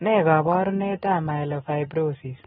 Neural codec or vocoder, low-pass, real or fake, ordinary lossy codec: none; 7.2 kHz; real; AAC, 16 kbps